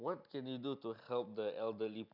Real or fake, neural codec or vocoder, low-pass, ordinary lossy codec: real; none; 5.4 kHz; none